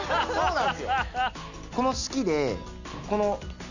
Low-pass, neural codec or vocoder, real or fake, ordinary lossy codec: 7.2 kHz; none; real; none